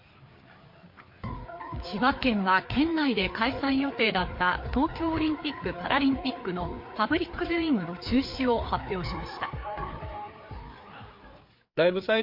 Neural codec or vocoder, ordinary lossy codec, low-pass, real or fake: codec, 16 kHz, 4 kbps, FreqCodec, larger model; MP3, 32 kbps; 5.4 kHz; fake